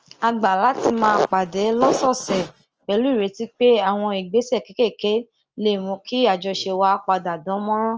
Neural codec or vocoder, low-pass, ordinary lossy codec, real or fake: none; 7.2 kHz; Opus, 24 kbps; real